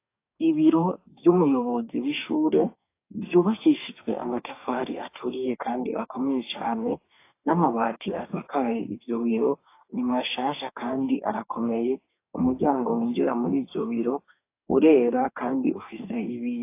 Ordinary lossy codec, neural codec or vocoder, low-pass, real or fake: AAC, 24 kbps; codec, 44.1 kHz, 2.6 kbps, DAC; 3.6 kHz; fake